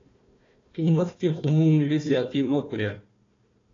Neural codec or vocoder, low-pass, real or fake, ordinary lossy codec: codec, 16 kHz, 1 kbps, FunCodec, trained on Chinese and English, 50 frames a second; 7.2 kHz; fake; AAC, 32 kbps